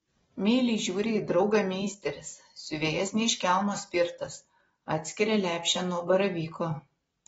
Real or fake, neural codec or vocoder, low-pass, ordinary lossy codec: real; none; 19.8 kHz; AAC, 24 kbps